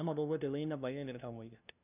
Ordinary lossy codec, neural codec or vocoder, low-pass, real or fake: none; codec, 16 kHz, 0.5 kbps, FunCodec, trained on LibriTTS, 25 frames a second; 3.6 kHz; fake